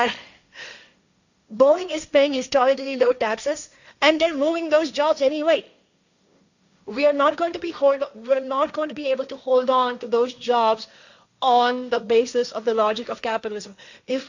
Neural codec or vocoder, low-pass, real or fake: codec, 16 kHz, 1.1 kbps, Voila-Tokenizer; 7.2 kHz; fake